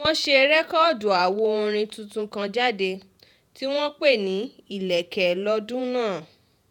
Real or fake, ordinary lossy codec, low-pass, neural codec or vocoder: fake; none; 19.8 kHz; vocoder, 48 kHz, 128 mel bands, Vocos